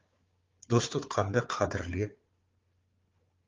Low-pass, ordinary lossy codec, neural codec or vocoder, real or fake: 7.2 kHz; Opus, 32 kbps; codec, 16 kHz, 4 kbps, FunCodec, trained on Chinese and English, 50 frames a second; fake